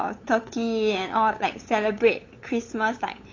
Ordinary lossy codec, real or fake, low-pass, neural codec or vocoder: AAC, 32 kbps; fake; 7.2 kHz; codec, 16 kHz, 8 kbps, FunCodec, trained on LibriTTS, 25 frames a second